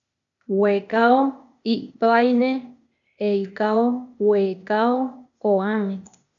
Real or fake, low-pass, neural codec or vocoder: fake; 7.2 kHz; codec, 16 kHz, 0.8 kbps, ZipCodec